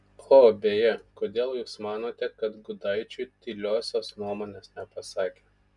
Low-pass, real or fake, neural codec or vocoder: 10.8 kHz; real; none